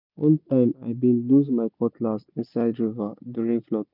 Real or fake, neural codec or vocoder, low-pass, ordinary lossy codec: real; none; 5.4 kHz; none